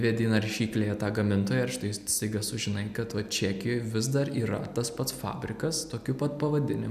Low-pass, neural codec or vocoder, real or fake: 14.4 kHz; none; real